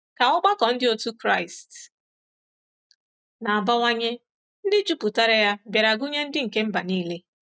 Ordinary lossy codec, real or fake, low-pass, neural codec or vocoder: none; real; none; none